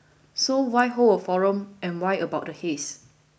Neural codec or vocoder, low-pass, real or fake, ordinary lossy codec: none; none; real; none